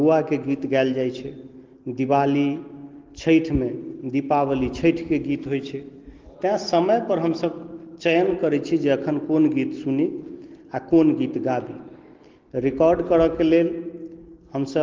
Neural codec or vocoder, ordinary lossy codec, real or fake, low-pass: none; Opus, 16 kbps; real; 7.2 kHz